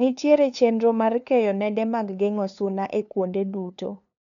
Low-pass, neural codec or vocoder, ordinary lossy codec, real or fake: 7.2 kHz; codec, 16 kHz, 2 kbps, FunCodec, trained on LibriTTS, 25 frames a second; none; fake